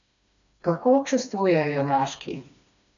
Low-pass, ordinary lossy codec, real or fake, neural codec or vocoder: 7.2 kHz; none; fake; codec, 16 kHz, 2 kbps, FreqCodec, smaller model